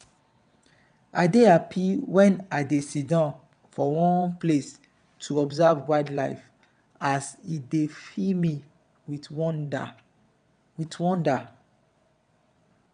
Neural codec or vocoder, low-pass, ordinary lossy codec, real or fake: vocoder, 22.05 kHz, 80 mel bands, WaveNeXt; 9.9 kHz; none; fake